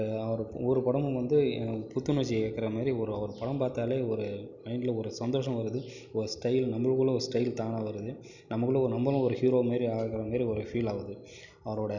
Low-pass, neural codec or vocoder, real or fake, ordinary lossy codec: 7.2 kHz; none; real; none